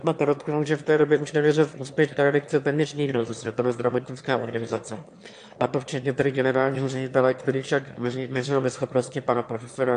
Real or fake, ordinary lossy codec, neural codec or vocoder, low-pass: fake; AAC, 64 kbps; autoencoder, 22.05 kHz, a latent of 192 numbers a frame, VITS, trained on one speaker; 9.9 kHz